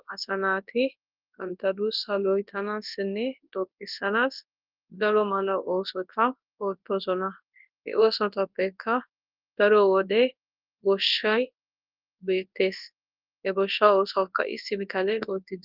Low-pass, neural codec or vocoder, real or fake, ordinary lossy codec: 5.4 kHz; codec, 24 kHz, 0.9 kbps, WavTokenizer, large speech release; fake; Opus, 24 kbps